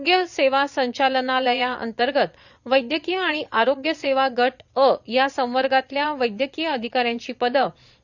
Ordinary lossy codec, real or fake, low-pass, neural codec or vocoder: none; fake; 7.2 kHz; vocoder, 22.05 kHz, 80 mel bands, Vocos